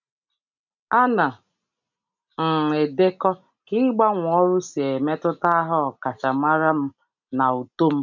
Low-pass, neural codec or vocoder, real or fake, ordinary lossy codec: 7.2 kHz; none; real; none